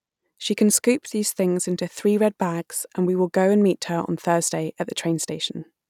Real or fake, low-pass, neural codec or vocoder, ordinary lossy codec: real; 19.8 kHz; none; none